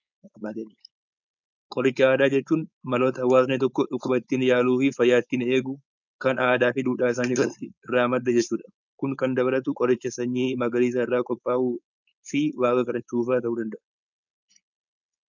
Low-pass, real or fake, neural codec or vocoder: 7.2 kHz; fake; codec, 16 kHz, 4.8 kbps, FACodec